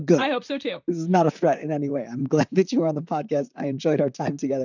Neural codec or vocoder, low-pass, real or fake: vocoder, 44.1 kHz, 128 mel bands, Pupu-Vocoder; 7.2 kHz; fake